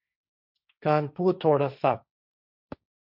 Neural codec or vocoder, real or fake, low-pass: codec, 16 kHz, 1.1 kbps, Voila-Tokenizer; fake; 5.4 kHz